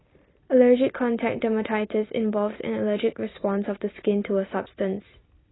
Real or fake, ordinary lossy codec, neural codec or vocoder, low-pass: real; AAC, 16 kbps; none; 7.2 kHz